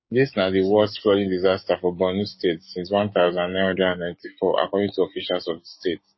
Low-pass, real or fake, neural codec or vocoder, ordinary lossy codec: 7.2 kHz; fake; codec, 16 kHz, 6 kbps, DAC; MP3, 24 kbps